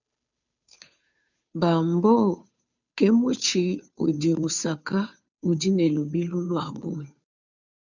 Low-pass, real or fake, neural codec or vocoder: 7.2 kHz; fake; codec, 16 kHz, 2 kbps, FunCodec, trained on Chinese and English, 25 frames a second